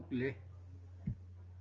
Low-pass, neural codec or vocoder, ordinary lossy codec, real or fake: 7.2 kHz; none; Opus, 32 kbps; real